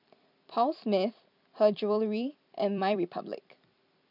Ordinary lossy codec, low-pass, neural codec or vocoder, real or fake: none; 5.4 kHz; vocoder, 44.1 kHz, 128 mel bands every 256 samples, BigVGAN v2; fake